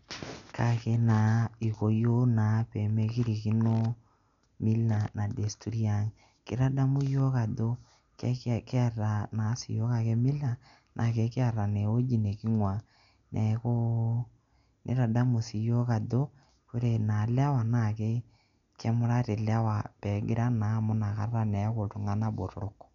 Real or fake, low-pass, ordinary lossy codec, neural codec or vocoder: real; 7.2 kHz; none; none